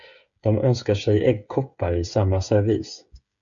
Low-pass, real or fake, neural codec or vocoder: 7.2 kHz; fake; codec, 16 kHz, 8 kbps, FreqCodec, smaller model